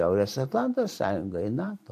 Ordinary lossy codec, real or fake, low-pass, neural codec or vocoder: AAC, 64 kbps; fake; 14.4 kHz; vocoder, 44.1 kHz, 128 mel bands every 512 samples, BigVGAN v2